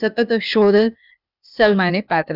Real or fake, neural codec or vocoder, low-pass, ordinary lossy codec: fake; codec, 16 kHz, 0.8 kbps, ZipCodec; 5.4 kHz; none